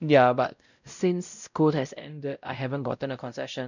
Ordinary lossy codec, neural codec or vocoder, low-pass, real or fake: none; codec, 16 kHz, 0.5 kbps, X-Codec, WavLM features, trained on Multilingual LibriSpeech; 7.2 kHz; fake